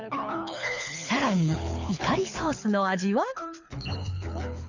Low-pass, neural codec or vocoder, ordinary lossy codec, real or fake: 7.2 kHz; codec, 24 kHz, 6 kbps, HILCodec; none; fake